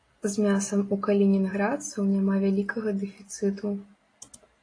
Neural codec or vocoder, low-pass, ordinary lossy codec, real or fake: none; 9.9 kHz; AAC, 32 kbps; real